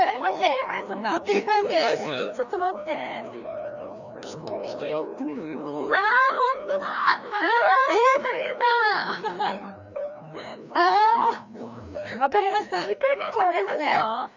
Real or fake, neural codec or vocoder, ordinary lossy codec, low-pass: fake; codec, 16 kHz, 1 kbps, FreqCodec, larger model; none; 7.2 kHz